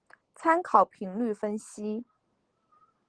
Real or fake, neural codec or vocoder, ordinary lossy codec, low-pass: real; none; Opus, 16 kbps; 9.9 kHz